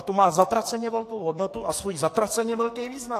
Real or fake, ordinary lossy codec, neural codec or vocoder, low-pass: fake; AAC, 64 kbps; codec, 44.1 kHz, 2.6 kbps, SNAC; 14.4 kHz